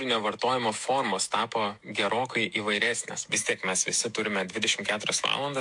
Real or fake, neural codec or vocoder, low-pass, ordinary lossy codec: fake; autoencoder, 48 kHz, 128 numbers a frame, DAC-VAE, trained on Japanese speech; 10.8 kHz; MP3, 64 kbps